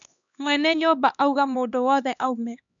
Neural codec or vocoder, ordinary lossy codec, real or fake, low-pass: codec, 16 kHz, 2 kbps, X-Codec, HuBERT features, trained on LibriSpeech; none; fake; 7.2 kHz